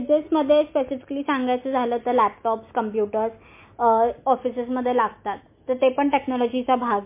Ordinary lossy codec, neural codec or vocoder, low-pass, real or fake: MP3, 24 kbps; none; 3.6 kHz; real